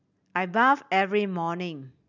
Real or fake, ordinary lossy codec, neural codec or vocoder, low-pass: real; none; none; 7.2 kHz